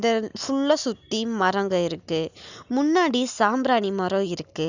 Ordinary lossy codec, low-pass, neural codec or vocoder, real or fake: none; 7.2 kHz; none; real